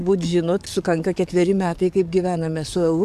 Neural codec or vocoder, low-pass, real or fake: codec, 44.1 kHz, 7.8 kbps, DAC; 14.4 kHz; fake